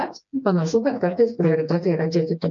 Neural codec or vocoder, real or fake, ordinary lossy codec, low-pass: codec, 16 kHz, 2 kbps, FreqCodec, smaller model; fake; MP3, 64 kbps; 7.2 kHz